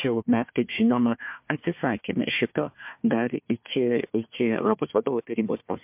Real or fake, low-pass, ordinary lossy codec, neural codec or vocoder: fake; 3.6 kHz; MP3, 32 kbps; codec, 16 kHz, 1 kbps, FunCodec, trained on Chinese and English, 50 frames a second